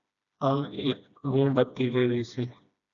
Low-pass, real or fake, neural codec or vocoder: 7.2 kHz; fake; codec, 16 kHz, 1 kbps, FreqCodec, smaller model